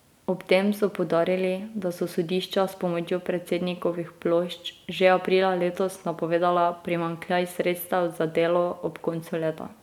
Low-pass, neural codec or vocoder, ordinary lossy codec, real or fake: 19.8 kHz; none; none; real